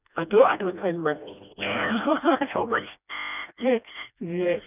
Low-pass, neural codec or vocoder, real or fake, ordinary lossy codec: 3.6 kHz; codec, 16 kHz, 1 kbps, FreqCodec, smaller model; fake; none